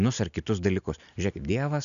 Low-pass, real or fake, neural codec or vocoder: 7.2 kHz; real; none